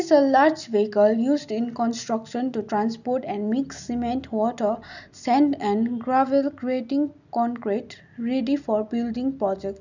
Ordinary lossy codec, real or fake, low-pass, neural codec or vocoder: none; real; 7.2 kHz; none